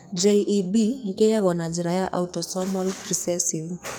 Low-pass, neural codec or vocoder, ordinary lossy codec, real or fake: none; codec, 44.1 kHz, 2.6 kbps, SNAC; none; fake